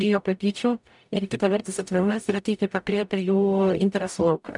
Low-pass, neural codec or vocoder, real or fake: 10.8 kHz; codec, 44.1 kHz, 0.9 kbps, DAC; fake